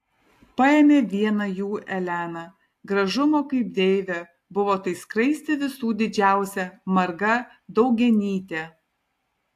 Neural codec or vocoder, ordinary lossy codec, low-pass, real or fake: none; AAC, 64 kbps; 14.4 kHz; real